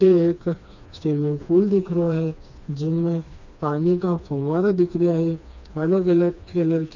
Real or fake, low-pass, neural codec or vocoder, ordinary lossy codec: fake; 7.2 kHz; codec, 16 kHz, 2 kbps, FreqCodec, smaller model; none